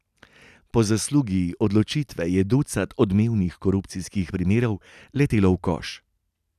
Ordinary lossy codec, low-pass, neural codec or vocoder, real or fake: none; 14.4 kHz; none; real